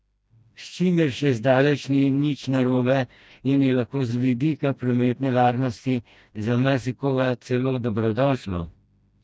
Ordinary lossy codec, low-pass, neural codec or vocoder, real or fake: none; none; codec, 16 kHz, 1 kbps, FreqCodec, smaller model; fake